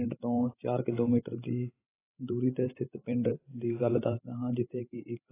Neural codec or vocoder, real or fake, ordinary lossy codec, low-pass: codec, 16 kHz, 16 kbps, FreqCodec, larger model; fake; AAC, 16 kbps; 3.6 kHz